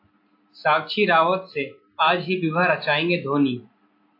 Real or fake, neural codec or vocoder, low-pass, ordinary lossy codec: real; none; 5.4 kHz; AAC, 32 kbps